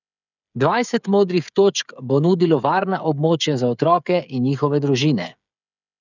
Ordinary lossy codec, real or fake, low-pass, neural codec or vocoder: none; fake; 7.2 kHz; codec, 16 kHz, 8 kbps, FreqCodec, smaller model